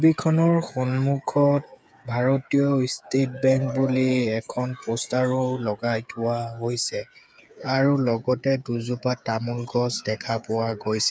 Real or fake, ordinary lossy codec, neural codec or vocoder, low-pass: fake; none; codec, 16 kHz, 8 kbps, FreqCodec, smaller model; none